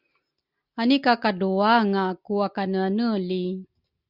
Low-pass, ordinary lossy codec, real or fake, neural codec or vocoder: 5.4 kHz; Opus, 64 kbps; real; none